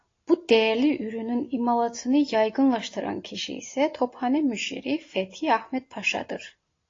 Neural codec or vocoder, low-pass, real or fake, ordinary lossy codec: none; 7.2 kHz; real; AAC, 32 kbps